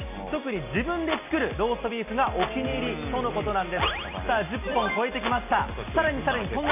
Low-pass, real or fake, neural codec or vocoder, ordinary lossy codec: 3.6 kHz; real; none; none